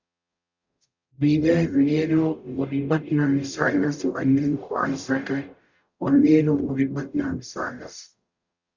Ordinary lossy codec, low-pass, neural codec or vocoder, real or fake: Opus, 64 kbps; 7.2 kHz; codec, 44.1 kHz, 0.9 kbps, DAC; fake